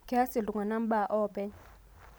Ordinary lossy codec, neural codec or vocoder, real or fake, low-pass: none; none; real; none